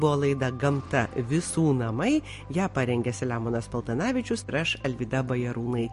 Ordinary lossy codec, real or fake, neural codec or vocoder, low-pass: MP3, 48 kbps; real; none; 14.4 kHz